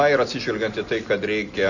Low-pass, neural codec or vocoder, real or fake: 7.2 kHz; none; real